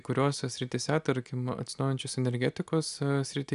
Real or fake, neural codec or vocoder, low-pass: real; none; 10.8 kHz